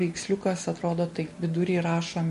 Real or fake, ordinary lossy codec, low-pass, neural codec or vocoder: real; MP3, 48 kbps; 14.4 kHz; none